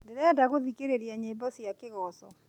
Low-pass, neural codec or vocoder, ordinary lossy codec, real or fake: 19.8 kHz; none; none; real